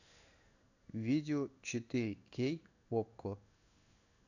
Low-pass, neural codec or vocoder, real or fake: 7.2 kHz; codec, 16 kHz in and 24 kHz out, 1 kbps, XY-Tokenizer; fake